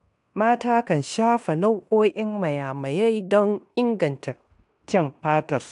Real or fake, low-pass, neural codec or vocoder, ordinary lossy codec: fake; 10.8 kHz; codec, 16 kHz in and 24 kHz out, 0.9 kbps, LongCat-Audio-Codec, fine tuned four codebook decoder; none